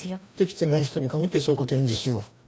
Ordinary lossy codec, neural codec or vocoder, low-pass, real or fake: none; codec, 16 kHz, 1 kbps, FreqCodec, larger model; none; fake